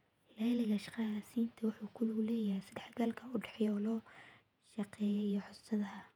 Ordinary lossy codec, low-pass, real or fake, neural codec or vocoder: none; 19.8 kHz; fake; vocoder, 48 kHz, 128 mel bands, Vocos